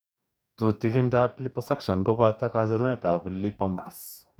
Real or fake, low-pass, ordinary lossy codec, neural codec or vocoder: fake; none; none; codec, 44.1 kHz, 2.6 kbps, DAC